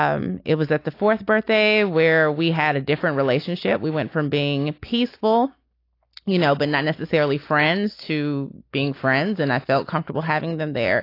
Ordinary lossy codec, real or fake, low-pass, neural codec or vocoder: AAC, 32 kbps; real; 5.4 kHz; none